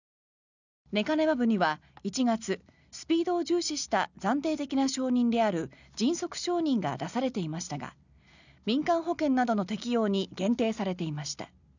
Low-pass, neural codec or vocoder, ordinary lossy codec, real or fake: 7.2 kHz; none; none; real